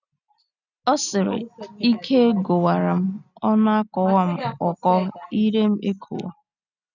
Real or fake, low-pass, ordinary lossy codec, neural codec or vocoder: real; 7.2 kHz; none; none